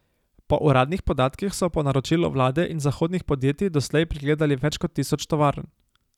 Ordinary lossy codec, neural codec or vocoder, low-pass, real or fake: none; none; 19.8 kHz; real